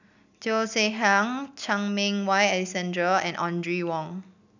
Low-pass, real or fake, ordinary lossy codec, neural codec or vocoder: 7.2 kHz; real; none; none